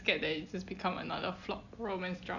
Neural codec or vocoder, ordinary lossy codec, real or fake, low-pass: none; none; real; 7.2 kHz